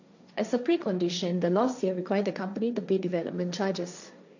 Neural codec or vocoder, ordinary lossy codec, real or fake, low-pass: codec, 16 kHz, 1.1 kbps, Voila-Tokenizer; none; fake; 7.2 kHz